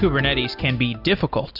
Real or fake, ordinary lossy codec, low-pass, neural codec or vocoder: real; AAC, 48 kbps; 5.4 kHz; none